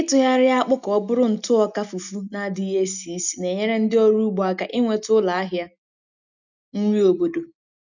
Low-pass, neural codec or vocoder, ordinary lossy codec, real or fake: 7.2 kHz; none; none; real